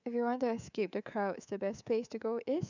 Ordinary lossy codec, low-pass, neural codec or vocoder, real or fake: none; 7.2 kHz; codec, 24 kHz, 3.1 kbps, DualCodec; fake